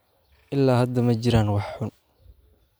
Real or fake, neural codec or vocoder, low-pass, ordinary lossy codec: real; none; none; none